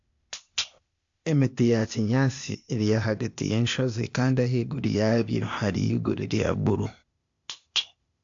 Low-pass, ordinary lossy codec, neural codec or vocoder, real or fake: 7.2 kHz; AAC, 64 kbps; codec, 16 kHz, 0.8 kbps, ZipCodec; fake